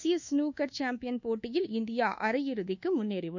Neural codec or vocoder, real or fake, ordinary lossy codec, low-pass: codec, 24 kHz, 1.2 kbps, DualCodec; fake; none; 7.2 kHz